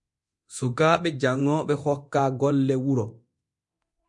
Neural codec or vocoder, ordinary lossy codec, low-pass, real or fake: codec, 24 kHz, 0.9 kbps, DualCodec; MP3, 64 kbps; 10.8 kHz; fake